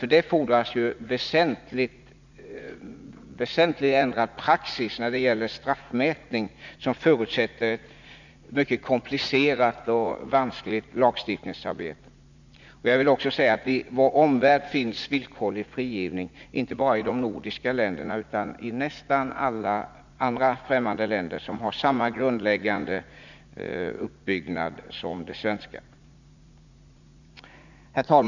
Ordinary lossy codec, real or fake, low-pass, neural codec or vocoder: none; fake; 7.2 kHz; vocoder, 44.1 kHz, 80 mel bands, Vocos